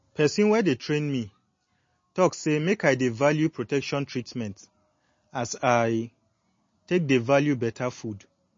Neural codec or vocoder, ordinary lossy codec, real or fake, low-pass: none; MP3, 32 kbps; real; 7.2 kHz